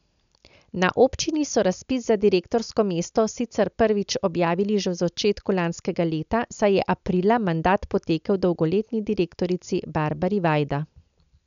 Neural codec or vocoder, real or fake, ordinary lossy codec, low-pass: none; real; none; 7.2 kHz